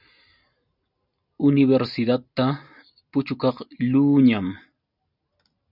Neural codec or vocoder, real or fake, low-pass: none; real; 5.4 kHz